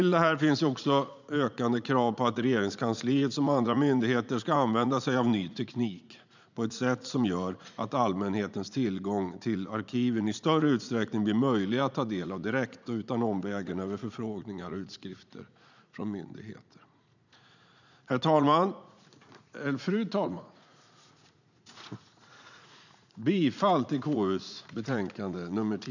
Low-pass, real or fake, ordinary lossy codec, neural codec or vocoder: 7.2 kHz; fake; none; vocoder, 44.1 kHz, 80 mel bands, Vocos